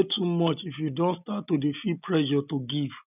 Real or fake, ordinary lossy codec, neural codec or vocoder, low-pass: real; none; none; 3.6 kHz